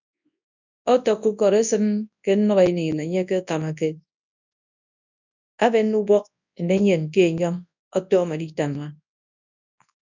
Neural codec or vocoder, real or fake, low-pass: codec, 24 kHz, 0.9 kbps, WavTokenizer, large speech release; fake; 7.2 kHz